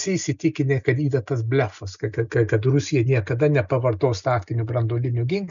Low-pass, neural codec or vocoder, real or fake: 7.2 kHz; none; real